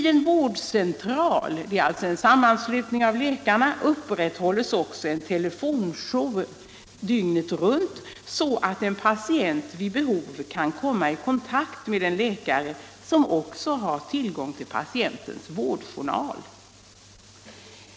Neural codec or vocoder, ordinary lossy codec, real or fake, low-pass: none; none; real; none